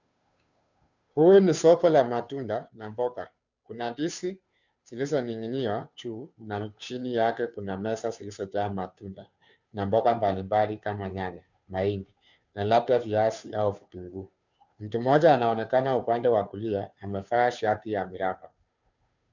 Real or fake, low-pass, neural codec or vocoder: fake; 7.2 kHz; codec, 16 kHz, 2 kbps, FunCodec, trained on Chinese and English, 25 frames a second